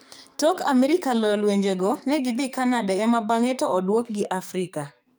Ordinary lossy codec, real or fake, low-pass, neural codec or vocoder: none; fake; none; codec, 44.1 kHz, 2.6 kbps, SNAC